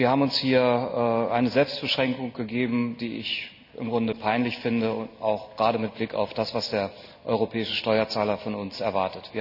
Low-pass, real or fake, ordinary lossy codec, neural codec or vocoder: 5.4 kHz; real; none; none